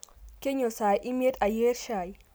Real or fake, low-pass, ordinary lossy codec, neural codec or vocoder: real; none; none; none